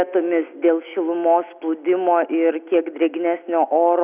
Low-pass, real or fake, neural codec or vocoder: 3.6 kHz; real; none